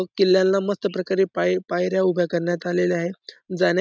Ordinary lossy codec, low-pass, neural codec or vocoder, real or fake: none; none; none; real